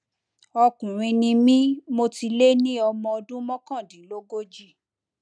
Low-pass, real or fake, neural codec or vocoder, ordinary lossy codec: 9.9 kHz; real; none; none